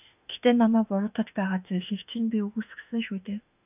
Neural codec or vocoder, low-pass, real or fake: autoencoder, 48 kHz, 32 numbers a frame, DAC-VAE, trained on Japanese speech; 3.6 kHz; fake